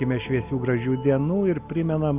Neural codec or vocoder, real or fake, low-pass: none; real; 3.6 kHz